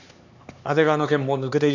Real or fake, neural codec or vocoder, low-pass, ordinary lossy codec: fake; codec, 16 kHz, 4 kbps, X-Codec, HuBERT features, trained on LibriSpeech; 7.2 kHz; none